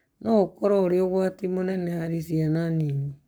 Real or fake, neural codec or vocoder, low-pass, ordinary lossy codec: fake; codec, 44.1 kHz, 7.8 kbps, Pupu-Codec; none; none